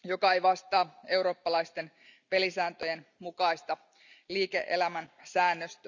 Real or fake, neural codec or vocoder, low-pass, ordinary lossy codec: real; none; 7.2 kHz; none